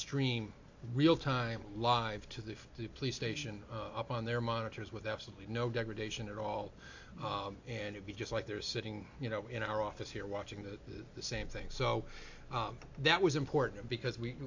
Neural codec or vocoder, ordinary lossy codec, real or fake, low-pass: none; AAC, 48 kbps; real; 7.2 kHz